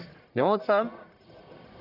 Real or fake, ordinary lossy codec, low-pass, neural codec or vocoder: fake; none; 5.4 kHz; codec, 44.1 kHz, 1.7 kbps, Pupu-Codec